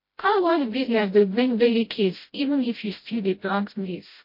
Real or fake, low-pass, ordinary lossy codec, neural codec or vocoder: fake; 5.4 kHz; MP3, 32 kbps; codec, 16 kHz, 0.5 kbps, FreqCodec, smaller model